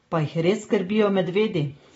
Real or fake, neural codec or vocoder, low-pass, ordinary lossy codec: real; none; 10.8 kHz; AAC, 24 kbps